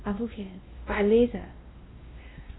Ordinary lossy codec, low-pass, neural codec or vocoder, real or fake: AAC, 16 kbps; 7.2 kHz; codec, 16 kHz, 1 kbps, X-Codec, WavLM features, trained on Multilingual LibriSpeech; fake